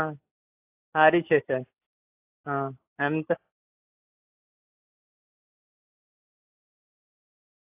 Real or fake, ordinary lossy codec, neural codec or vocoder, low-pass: real; AAC, 24 kbps; none; 3.6 kHz